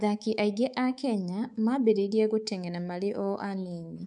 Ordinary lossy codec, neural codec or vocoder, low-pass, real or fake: none; codec, 24 kHz, 3.1 kbps, DualCodec; 10.8 kHz; fake